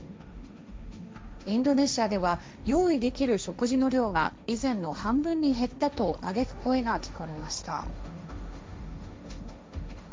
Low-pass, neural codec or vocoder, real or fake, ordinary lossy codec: none; codec, 16 kHz, 1.1 kbps, Voila-Tokenizer; fake; none